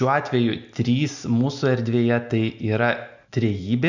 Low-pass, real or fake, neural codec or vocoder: 7.2 kHz; real; none